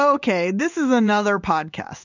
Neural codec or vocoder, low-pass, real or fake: codec, 16 kHz in and 24 kHz out, 1 kbps, XY-Tokenizer; 7.2 kHz; fake